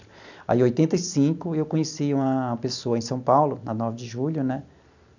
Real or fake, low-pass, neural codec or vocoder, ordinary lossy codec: real; 7.2 kHz; none; none